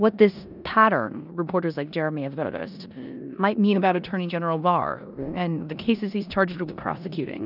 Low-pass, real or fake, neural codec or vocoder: 5.4 kHz; fake; codec, 16 kHz in and 24 kHz out, 0.9 kbps, LongCat-Audio-Codec, four codebook decoder